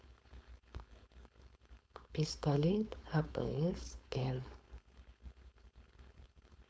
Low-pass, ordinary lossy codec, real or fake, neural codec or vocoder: none; none; fake; codec, 16 kHz, 4.8 kbps, FACodec